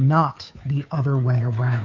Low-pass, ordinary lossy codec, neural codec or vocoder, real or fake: 7.2 kHz; AAC, 48 kbps; codec, 16 kHz, 2 kbps, FunCodec, trained on Chinese and English, 25 frames a second; fake